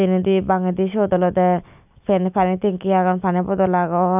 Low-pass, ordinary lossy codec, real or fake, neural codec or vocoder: 3.6 kHz; none; real; none